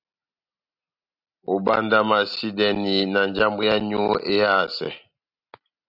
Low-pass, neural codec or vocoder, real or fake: 5.4 kHz; none; real